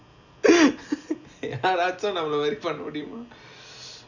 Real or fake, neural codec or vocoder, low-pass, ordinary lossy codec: real; none; 7.2 kHz; none